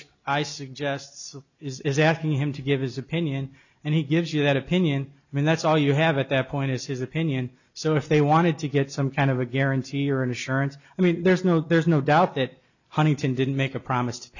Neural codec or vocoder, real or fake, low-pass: none; real; 7.2 kHz